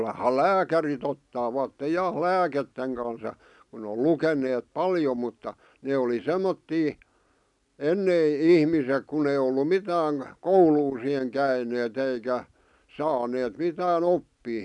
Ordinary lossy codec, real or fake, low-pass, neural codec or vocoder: none; real; 10.8 kHz; none